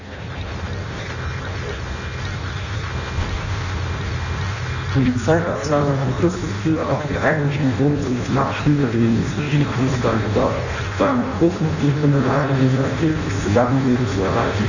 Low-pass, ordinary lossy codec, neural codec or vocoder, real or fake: 7.2 kHz; none; codec, 16 kHz in and 24 kHz out, 0.6 kbps, FireRedTTS-2 codec; fake